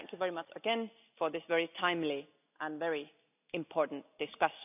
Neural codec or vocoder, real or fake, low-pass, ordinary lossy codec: none; real; 3.6 kHz; none